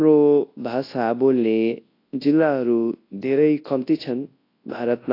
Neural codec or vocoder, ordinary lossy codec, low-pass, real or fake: codec, 24 kHz, 0.9 kbps, WavTokenizer, large speech release; AAC, 32 kbps; 5.4 kHz; fake